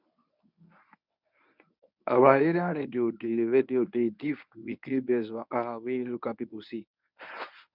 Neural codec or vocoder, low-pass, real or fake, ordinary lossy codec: codec, 24 kHz, 0.9 kbps, WavTokenizer, medium speech release version 1; 5.4 kHz; fake; none